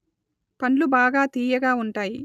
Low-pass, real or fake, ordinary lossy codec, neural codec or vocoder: 14.4 kHz; real; none; none